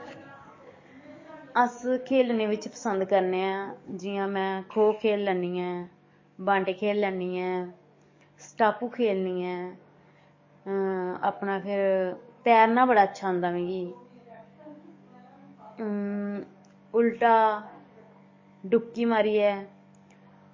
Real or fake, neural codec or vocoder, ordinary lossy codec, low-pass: fake; codec, 44.1 kHz, 7.8 kbps, DAC; MP3, 32 kbps; 7.2 kHz